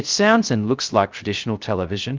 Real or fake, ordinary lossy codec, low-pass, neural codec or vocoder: fake; Opus, 24 kbps; 7.2 kHz; codec, 16 kHz, 0.3 kbps, FocalCodec